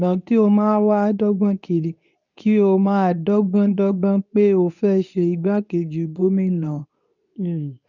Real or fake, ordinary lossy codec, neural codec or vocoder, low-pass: fake; none; codec, 24 kHz, 0.9 kbps, WavTokenizer, medium speech release version 2; 7.2 kHz